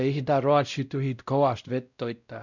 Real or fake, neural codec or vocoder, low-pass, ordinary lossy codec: fake; codec, 16 kHz, 0.5 kbps, X-Codec, WavLM features, trained on Multilingual LibriSpeech; 7.2 kHz; none